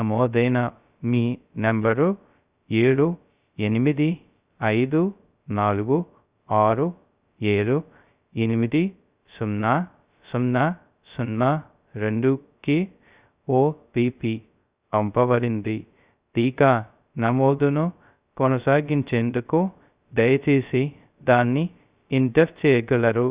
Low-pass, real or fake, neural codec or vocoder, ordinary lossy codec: 3.6 kHz; fake; codec, 16 kHz, 0.2 kbps, FocalCodec; Opus, 64 kbps